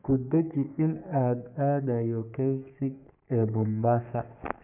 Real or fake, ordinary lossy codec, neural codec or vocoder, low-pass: fake; none; codec, 44.1 kHz, 3.4 kbps, Pupu-Codec; 3.6 kHz